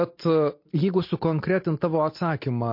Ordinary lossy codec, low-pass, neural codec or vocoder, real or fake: MP3, 32 kbps; 5.4 kHz; none; real